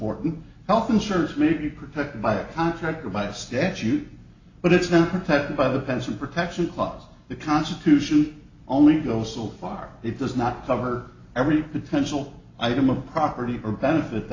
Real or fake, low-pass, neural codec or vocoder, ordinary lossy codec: real; 7.2 kHz; none; AAC, 48 kbps